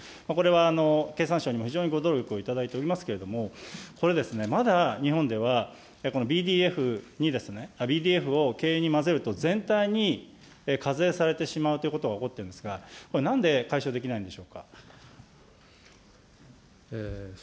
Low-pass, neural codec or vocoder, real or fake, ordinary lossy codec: none; none; real; none